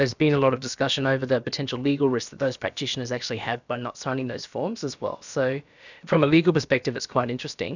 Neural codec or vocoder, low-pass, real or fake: codec, 16 kHz, about 1 kbps, DyCAST, with the encoder's durations; 7.2 kHz; fake